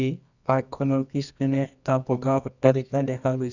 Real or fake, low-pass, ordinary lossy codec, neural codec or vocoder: fake; 7.2 kHz; none; codec, 24 kHz, 0.9 kbps, WavTokenizer, medium music audio release